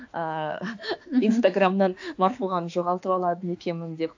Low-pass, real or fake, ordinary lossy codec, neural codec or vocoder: 7.2 kHz; fake; AAC, 48 kbps; autoencoder, 48 kHz, 32 numbers a frame, DAC-VAE, trained on Japanese speech